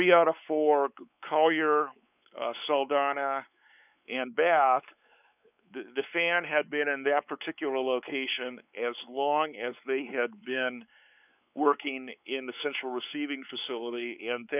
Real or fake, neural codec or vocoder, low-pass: fake; codec, 16 kHz, 4 kbps, X-Codec, WavLM features, trained on Multilingual LibriSpeech; 3.6 kHz